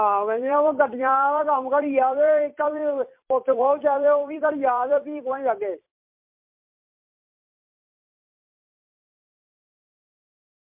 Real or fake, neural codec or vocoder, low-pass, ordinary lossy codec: fake; vocoder, 44.1 kHz, 128 mel bands every 256 samples, BigVGAN v2; 3.6 kHz; MP3, 32 kbps